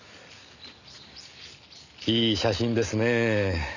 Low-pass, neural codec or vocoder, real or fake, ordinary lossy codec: 7.2 kHz; none; real; none